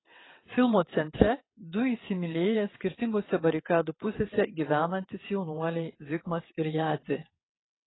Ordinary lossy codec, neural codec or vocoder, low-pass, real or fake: AAC, 16 kbps; vocoder, 22.05 kHz, 80 mel bands, WaveNeXt; 7.2 kHz; fake